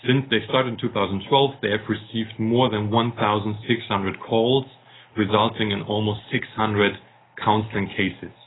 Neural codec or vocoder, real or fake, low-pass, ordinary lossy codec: none; real; 7.2 kHz; AAC, 16 kbps